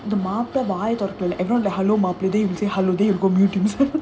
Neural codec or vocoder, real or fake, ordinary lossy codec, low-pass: none; real; none; none